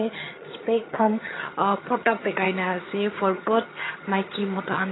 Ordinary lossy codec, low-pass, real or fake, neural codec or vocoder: AAC, 16 kbps; 7.2 kHz; fake; vocoder, 22.05 kHz, 80 mel bands, HiFi-GAN